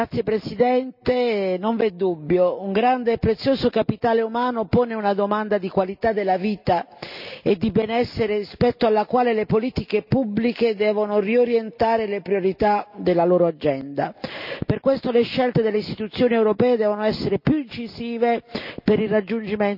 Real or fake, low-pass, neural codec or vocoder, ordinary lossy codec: real; 5.4 kHz; none; none